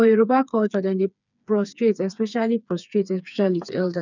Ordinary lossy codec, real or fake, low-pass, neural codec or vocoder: none; fake; 7.2 kHz; codec, 16 kHz, 4 kbps, FreqCodec, smaller model